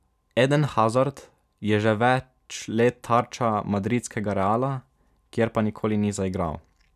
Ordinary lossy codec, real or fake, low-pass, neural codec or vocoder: none; real; 14.4 kHz; none